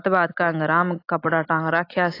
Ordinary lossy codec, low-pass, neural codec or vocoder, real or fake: AAC, 32 kbps; 5.4 kHz; none; real